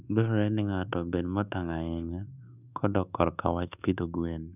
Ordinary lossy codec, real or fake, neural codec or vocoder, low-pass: none; fake; codec, 24 kHz, 1.2 kbps, DualCodec; 3.6 kHz